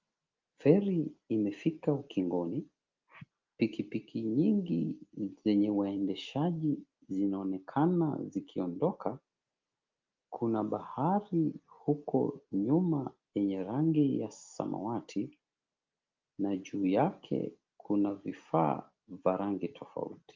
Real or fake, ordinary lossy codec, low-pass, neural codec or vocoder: real; Opus, 32 kbps; 7.2 kHz; none